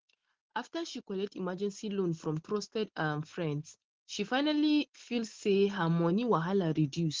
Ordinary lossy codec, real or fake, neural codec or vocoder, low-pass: Opus, 16 kbps; real; none; 7.2 kHz